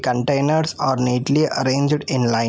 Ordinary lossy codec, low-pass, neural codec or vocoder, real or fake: none; none; none; real